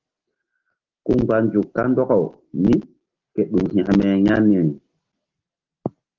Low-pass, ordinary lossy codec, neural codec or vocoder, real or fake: 7.2 kHz; Opus, 16 kbps; none; real